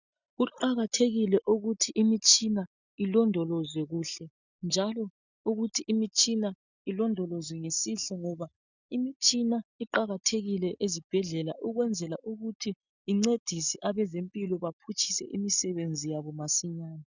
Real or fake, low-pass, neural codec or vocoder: real; 7.2 kHz; none